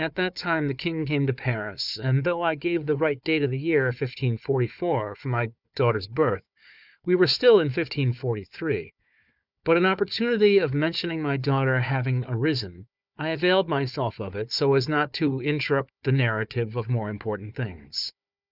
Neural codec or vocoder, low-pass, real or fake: codec, 16 kHz, 4 kbps, FunCodec, trained on Chinese and English, 50 frames a second; 5.4 kHz; fake